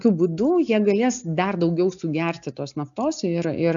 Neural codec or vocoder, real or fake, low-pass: none; real; 7.2 kHz